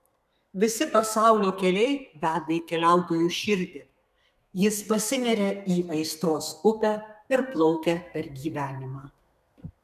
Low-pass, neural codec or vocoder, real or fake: 14.4 kHz; codec, 32 kHz, 1.9 kbps, SNAC; fake